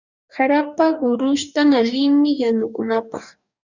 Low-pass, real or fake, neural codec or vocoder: 7.2 kHz; fake; codec, 44.1 kHz, 2.6 kbps, DAC